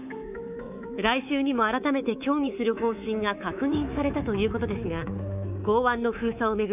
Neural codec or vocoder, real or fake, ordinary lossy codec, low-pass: codec, 24 kHz, 3.1 kbps, DualCodec; fake; none; 3.6 kHz